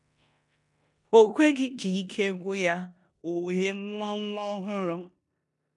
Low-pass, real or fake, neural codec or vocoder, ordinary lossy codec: 10.8 kHz; fake; codec, 16 kHz in and 24 kHz out, 0.9 kbps, LongCat-Audio-Codec, four codebook decoder; MP3, 96 kbps